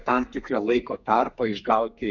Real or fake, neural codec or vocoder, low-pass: fake; codec, 32 kHz, 1.9 kbps, SNAC; 7.2 kHz